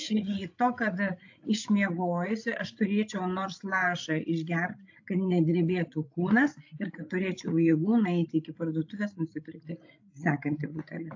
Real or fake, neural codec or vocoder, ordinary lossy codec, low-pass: fake; codec, 16 kHz, 16 kbps, FreqCodec, larger model; AAC, 48 kbps; 7.2 kHz